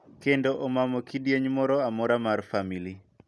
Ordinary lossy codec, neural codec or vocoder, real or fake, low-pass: none; none; real; none